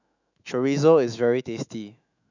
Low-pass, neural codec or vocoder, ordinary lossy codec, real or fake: 7.2 kHz; autoencoder, 48 kHz, 128 numbers a frame, DAC-VAE, trained on Japanese speech; none; fake